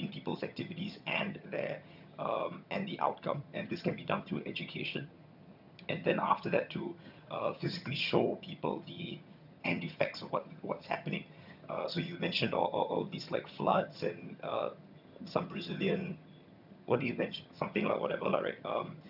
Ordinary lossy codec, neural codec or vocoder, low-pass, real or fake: none; vocoder, 22.05 kHz, 80 mel bands, HiFi-GAN; 5.4 kHz; fake